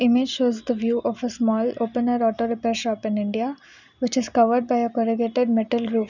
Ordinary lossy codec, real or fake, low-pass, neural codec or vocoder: none; real; 7.2 kHz; none